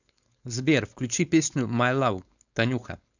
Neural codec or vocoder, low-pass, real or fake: codec, 16 kHz, 4.8 kbps, FACodec; 7.2 kHz; fake